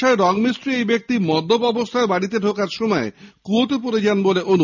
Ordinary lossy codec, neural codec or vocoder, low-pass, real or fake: none; none; 7.2 kHz; real